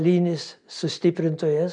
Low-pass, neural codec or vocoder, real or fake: 9.9 kHz; none; real